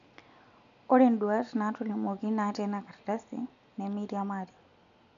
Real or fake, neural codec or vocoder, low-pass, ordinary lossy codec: real; none; 7.2 kHz; none